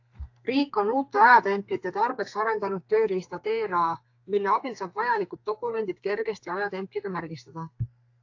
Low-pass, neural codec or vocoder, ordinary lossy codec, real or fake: 7.2 kHz; codec, 32 kHz, 1.9 kbps, SNAC; AAC, 48 kbps; fake